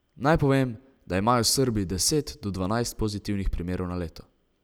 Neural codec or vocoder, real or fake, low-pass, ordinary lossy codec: none; real; none; none